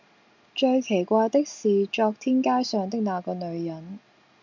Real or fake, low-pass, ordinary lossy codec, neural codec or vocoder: real; 7.2 kHz; MP3, 64 kbps; none